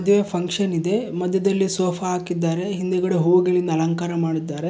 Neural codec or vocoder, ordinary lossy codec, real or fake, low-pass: none; none; real; none